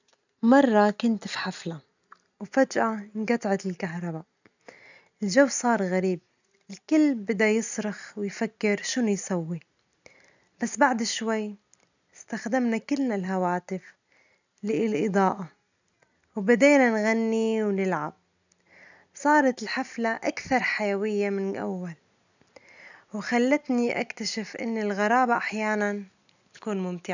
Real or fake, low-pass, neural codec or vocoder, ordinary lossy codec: real; 7.2 kHz; none; none